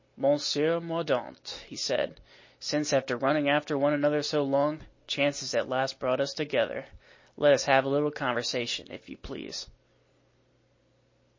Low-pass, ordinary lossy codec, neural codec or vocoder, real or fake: 7.2 kHz; MP3, 32 kbps; none; real